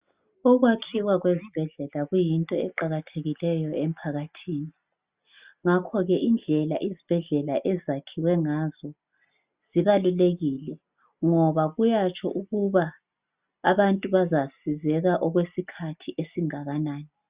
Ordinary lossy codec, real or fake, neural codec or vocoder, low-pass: Opus, 64 kbps; real; none; 3.6 kHz